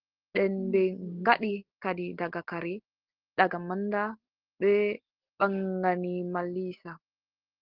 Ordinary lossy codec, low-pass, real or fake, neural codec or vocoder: Opus, 16 kbps; 5.4 kHz; real; none